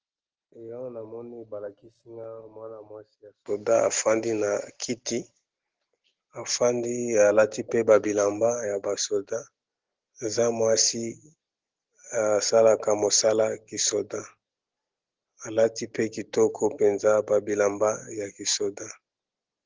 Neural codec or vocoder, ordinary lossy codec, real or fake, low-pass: none; Opus, 16 kbps; real; 7.2 kHz